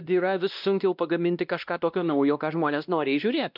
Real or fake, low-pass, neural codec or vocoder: fake; 5.4 kHz; codec, 16 kHz, 1 kbps, X-Codec, WavLM features, trained on Multilingual LibriSpeech